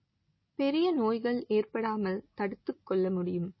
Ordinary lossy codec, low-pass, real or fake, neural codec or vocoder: MP3, 24 kbps; 7.2 kHz; real; none